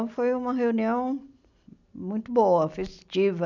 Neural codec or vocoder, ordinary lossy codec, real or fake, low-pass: none; none; real; 7.2 kHz